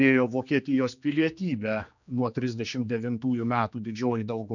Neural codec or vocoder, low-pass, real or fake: codec, 16 kHz, 2 kbps, X-Codec, HuBERT features, trained on general audio; 7.2 kHz; fake